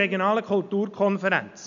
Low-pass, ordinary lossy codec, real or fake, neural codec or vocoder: 7.2 kHz; none; real; none